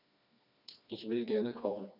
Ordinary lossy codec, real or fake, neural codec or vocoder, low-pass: none; fake; codec, 16 kHz, 2 kbps, FreqCodec, smaller model; 5.4 kHz